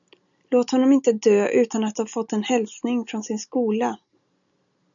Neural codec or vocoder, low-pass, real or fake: none; 7.2 kHz; real